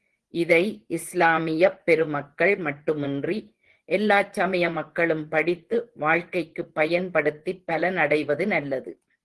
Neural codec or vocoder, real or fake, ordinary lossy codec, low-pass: vocoder, 24 kHz, 100 mel bands, Vocos; fake; Opus, 16 kbps; 10.8 kHz